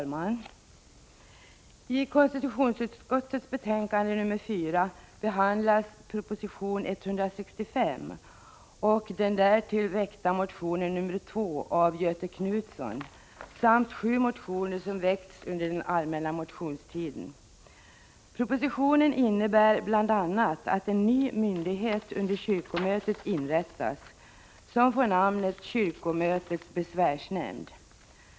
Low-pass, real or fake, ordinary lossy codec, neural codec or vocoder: none; real; none; none